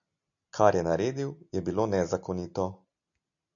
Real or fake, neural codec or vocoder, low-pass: real; none; 7.2 kHz